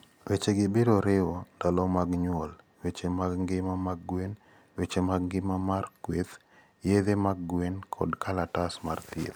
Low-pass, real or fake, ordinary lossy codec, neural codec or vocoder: none; real; none; none